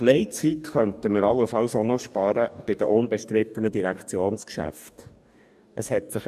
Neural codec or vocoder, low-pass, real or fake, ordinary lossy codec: codec, 44.1 kHz, 2.6 kbps, DAC; 14.4 kHz; fake; none